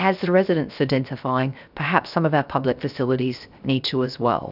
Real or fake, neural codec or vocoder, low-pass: fake; codec, 16 kHz, 0.7 kbps, FocalCodec; 5.4 kHz